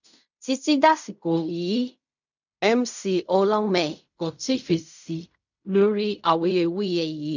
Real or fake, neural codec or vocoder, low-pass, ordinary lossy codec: fake; codec, 16 kHz in and 24 kHz out, 0.4 kbps, LongCat-Audio-Codec, fine tuned four codebook decoder; 7.2 kHz; none